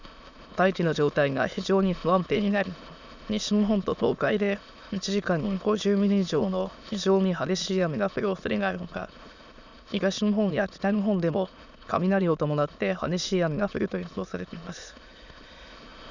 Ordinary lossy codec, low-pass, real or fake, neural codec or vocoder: none; 7.2 kHz; fake; autoencoder, 22.05 kHz, a latent of 192 numbers a frame, VITS, trained on many speakers